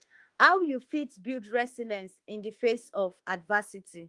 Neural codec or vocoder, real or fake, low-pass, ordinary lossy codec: autoencoder, 48 kHz, 32 numbers a frame, DAC-VAE, trained on Japanese speech; fake; 10.8 kHz; Opus, 24 kbps